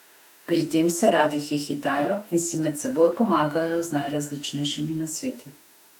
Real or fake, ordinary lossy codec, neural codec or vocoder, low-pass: fake; none; autoencoder, 48 kHz, 32 numbers a frame, DAC-VAE, trained on Japanese speech; 19.8 kHz